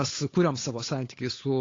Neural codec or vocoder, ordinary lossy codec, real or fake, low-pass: codec, 16 kHz, 8 kbps, FunCodec, trained on Chinese and English, 25 frames a second; AAC, 32 kbps; fake; 7.2 kHz